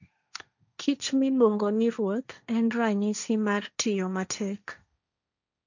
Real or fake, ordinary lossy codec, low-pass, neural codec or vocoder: fake; none; 7.2 kHz; codec, 16 kHz, 1.1 kbps, Voila-Tokenizer